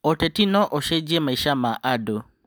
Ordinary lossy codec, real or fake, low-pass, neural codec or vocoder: none; real; none; none